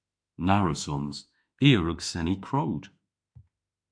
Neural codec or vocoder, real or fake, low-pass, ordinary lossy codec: autoencoder, 48 kHz, 32 numbers a frame, DAC-VAE, trained on Japanese speech; fake; 9.9 kHz; AAC, 64 kbps